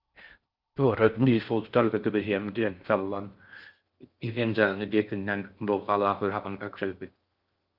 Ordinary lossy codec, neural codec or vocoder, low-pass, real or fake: Opus, 24 kbps; codec, 16 kHz in and 24 kHz out, 0.6 kbps, FocalCodec, streaming, 4096 codes; 5.4 kHz; fake